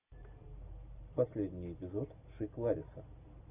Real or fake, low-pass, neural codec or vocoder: real; 3.6 kHz; none